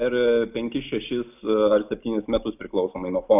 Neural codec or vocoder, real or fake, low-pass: none; real; 3.6 kHz